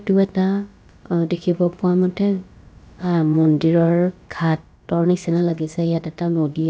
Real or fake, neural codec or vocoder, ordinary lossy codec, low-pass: fake; codec, 16 kHz, about 1 kbps, DyCAST, with the encoder's durations; none; none